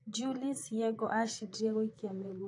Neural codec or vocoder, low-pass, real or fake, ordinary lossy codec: vocoder, 44.1 kHz, 128 mel bands, Pupu-Vocoder; 10.8 kHz; fake; AAC, 48 kbps